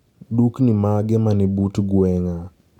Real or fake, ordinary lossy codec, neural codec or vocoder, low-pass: real; none; none; 19.8 kHz